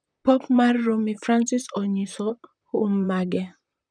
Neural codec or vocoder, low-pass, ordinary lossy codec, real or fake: vocoder, 44.1 kHz, 128 mel bands, Pupu-Vocoder; 9.9 kHz; none; fake